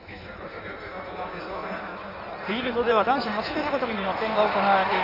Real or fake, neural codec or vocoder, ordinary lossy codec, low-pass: fake; codec, 16 kHz in and 24 kHz out, 1.1 kbps, FireRedTTS-2 codec; none; 5.4 kHz